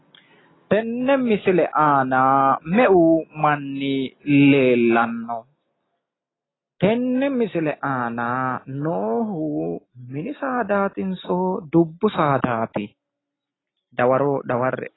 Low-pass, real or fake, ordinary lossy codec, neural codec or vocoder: 7.2 kHz; real; AAC, 16 kbps; none